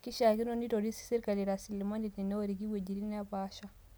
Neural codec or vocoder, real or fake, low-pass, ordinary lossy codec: none; real; none; none